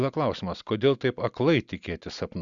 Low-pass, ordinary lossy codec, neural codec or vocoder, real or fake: 7.2 kHz; Opus, 64 kbps; none; real